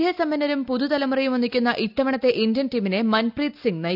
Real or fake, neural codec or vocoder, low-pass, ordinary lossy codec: real; none; 5.4 kHz; none